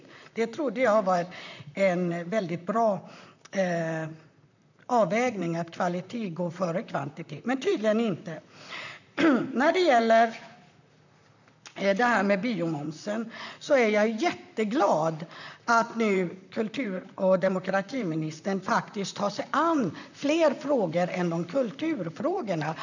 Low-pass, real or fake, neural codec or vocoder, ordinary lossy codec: 7.2 kHz; fake; vocoder, 44.1 kHz, 128 mel bands, Pupu-Vocoder; none